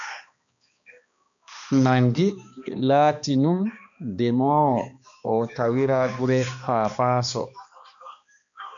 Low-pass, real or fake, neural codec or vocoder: 7.2 kHz; fake; codec, 16 kHz, 2 kbps, X-Codec, HuBERT features, trained on balanced general audio